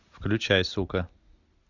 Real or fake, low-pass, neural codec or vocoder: real; 7.2 kHz; none